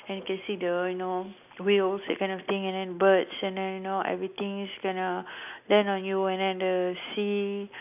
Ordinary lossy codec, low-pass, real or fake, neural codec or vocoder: none; 3.6 kHz; real; none